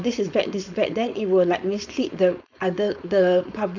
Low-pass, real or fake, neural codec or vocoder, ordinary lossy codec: 7.2 kHz; fake; codec, 16 kHz, 4.8 kbps, FACodec; none